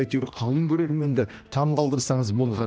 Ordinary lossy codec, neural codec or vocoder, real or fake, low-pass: none; codec, 16 kHz, 1 kbps, X-Codec, HuBERT features, trained on general audio; fake; none